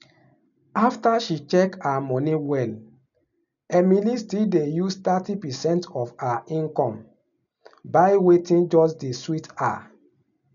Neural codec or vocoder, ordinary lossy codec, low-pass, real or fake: none; none; 7.2 kHz; real